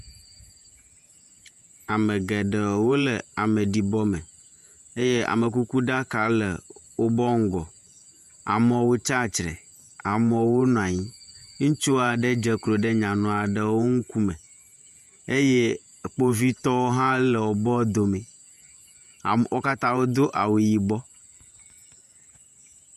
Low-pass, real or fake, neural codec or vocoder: 14.4 kHz; fake; vocoder, 48 kHz, 128 mel bands, Vocos